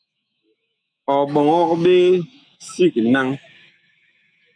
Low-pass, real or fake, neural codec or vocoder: 9.9 kHz; fake; autoencoder, 48 kHz, 128 numbers a frame, DAC-VAE, trained on Japanese speech